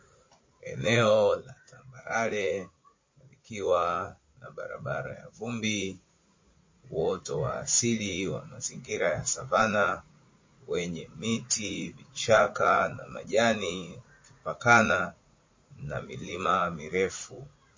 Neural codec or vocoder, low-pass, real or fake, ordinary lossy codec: vocoder, 44.1 kHz, 80 mel bands, Vocos; 7.2 kHz; fake; MP3, 32 kbps